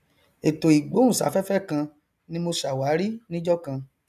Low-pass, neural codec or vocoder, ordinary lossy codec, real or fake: 14.4 kHz; none; none; real